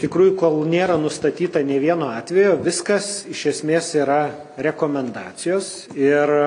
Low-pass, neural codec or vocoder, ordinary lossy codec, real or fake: 9.9 kHz; none; AAC, 48 kbps; real